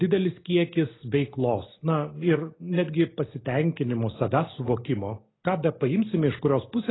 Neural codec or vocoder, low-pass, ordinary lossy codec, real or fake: none; 7.2 kHz; AAC, 16 kbps; real